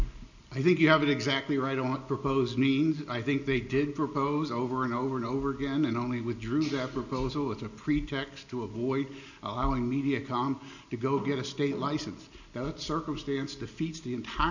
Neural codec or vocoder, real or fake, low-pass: none; real; 7.2 kHz